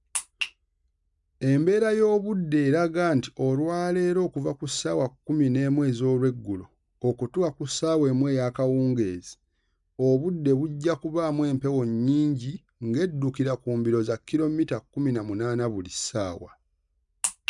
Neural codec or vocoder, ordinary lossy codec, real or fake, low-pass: none; none; real; 10.8 kHz